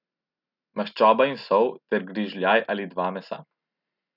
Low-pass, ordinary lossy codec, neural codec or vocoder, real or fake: 5.4 kHz; none; none; real